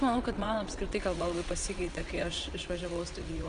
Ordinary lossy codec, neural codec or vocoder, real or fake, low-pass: Opus, 64 kbps; vocoder, 22.05 kHz, 80 mel bands, WaveNeXt; fake; 9.9 kHz